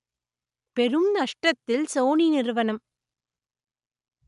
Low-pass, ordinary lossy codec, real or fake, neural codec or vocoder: 10.8 kHz; MP3, 96 kbps; real; none